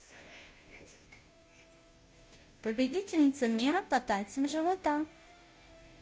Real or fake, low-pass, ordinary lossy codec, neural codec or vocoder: fake; none; none; codec, 16 kHz, 0.5 kbps, FunCodec, trained on Chinese and English, 25 frames a second